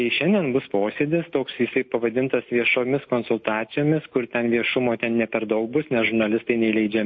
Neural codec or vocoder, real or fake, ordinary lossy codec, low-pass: none; real; MP3, 32 kbps; 7.2 kHz